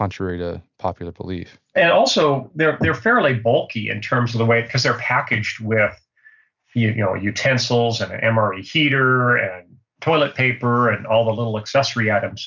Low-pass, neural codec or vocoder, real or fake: 7.2 kHz; none; real